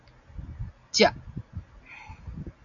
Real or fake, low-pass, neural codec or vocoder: real; 7.2 kHz; none